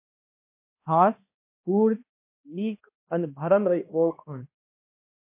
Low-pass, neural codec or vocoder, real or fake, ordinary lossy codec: 3.6 kHz; codec, 16 kHz, 1 kbps, X-Codec, HuBERT features, trained on balanced general audio; fake; MP3, 24 kbps